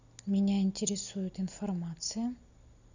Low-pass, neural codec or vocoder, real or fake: 7.2 kHz; none; real